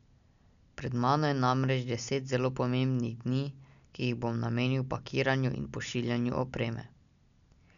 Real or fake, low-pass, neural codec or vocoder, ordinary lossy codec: real; 7.2 kHz; none; none